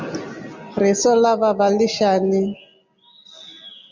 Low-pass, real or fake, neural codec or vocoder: 7.2 kHz; real; none